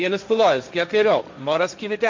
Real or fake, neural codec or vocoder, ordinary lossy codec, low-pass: fake; codec, 16 kHz, 1.1 kbps, Voila-Tokenizer; MP3, 48 kbps; 7.2 kHz